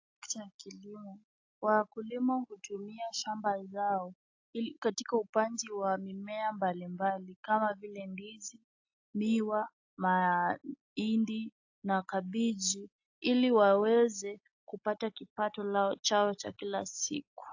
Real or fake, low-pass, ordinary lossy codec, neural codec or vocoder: real; 7.2 kHz; AAC, 48 kbps; none